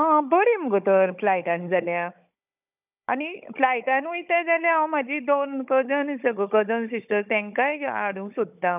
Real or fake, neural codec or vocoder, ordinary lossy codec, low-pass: fake; codec, 16 kHz, 16 kbps, FunCodec, trained on Chinese and English, 50 frames a second; none; 3.6 kHz